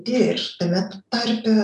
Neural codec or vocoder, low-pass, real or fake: none; 10.8 kHz; real